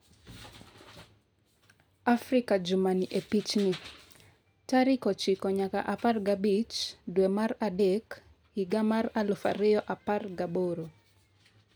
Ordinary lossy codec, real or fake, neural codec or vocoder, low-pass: none; real; none; none